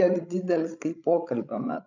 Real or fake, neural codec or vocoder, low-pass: fake; codec, 16 kHz, 16 kbps, FreqCodec, larger model; 7.2 kHz